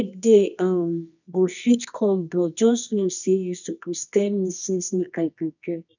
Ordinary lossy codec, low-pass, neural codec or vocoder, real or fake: none; 7.2 kHz; codec, 24 kHz, 0.9 kbps, WavTokenizer, medium music audio release; fake